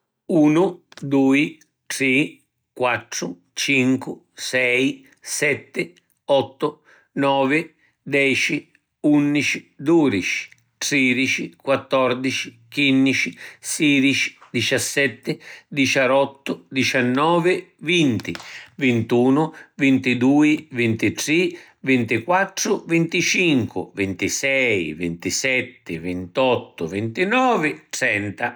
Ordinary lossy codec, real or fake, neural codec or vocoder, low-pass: none; real; none; none